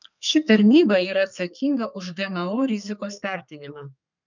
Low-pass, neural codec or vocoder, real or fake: 7.2 kHz; codec, 32 kHz, 1.9 kbps, SNAC; fake